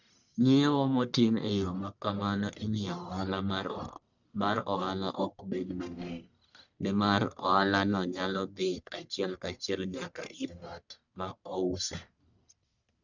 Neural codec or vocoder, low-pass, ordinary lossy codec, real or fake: codec, 44.1 kHz, 1.7 kbps, Pupu-Codec; 7.2 kHz; none; fake